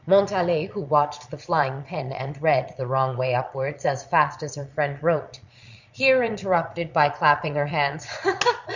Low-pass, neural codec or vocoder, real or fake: 7.2 kHz; vocoder, 22.05 kHz, 80 mel bands, Vocos; fake